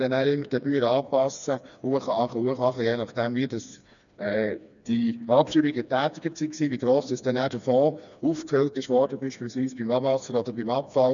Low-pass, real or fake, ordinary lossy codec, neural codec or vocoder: 7.2 kHz; fake; none; codec, 16 kHz, 2 kbps, FreqCodec, smaller model